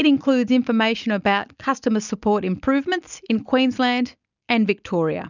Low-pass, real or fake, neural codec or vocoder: 7.2 kHz; real; none